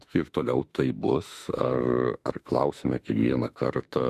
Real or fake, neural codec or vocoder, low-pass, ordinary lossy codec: fake; autoencoder, 48 kHz, 32 numbers a frame, DAC-VAE, trained on Japanese speech; 14.4 kHz; Opus, 64 kbps